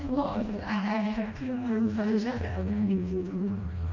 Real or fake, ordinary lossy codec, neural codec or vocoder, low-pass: fake; none; codec, 16 kHz, 1 kbps, FreqCodec, smaller model; 7.2 kHz